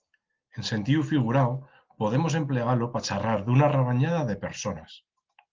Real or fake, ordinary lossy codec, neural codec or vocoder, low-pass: real; Opus, 16 kbps; none; 7.2 kHz